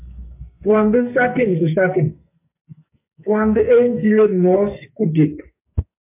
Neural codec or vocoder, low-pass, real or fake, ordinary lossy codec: codec, 44.1 kHz, 2.6 kbps, SNAC; 3.6 kHz; fake; MP3, 32 kbps